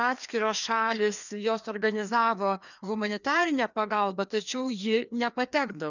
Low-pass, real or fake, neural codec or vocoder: 7.2 kHz; fake; codec, 16 kHz, 2 kbps, FreqCodec, larger model